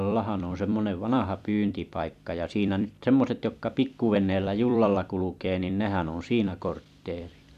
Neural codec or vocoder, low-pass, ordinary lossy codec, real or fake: vocoder, 44.1 kHz, 128 mel bands every 256 samples, BigVGAN v2; 14.4 kHz; none; fake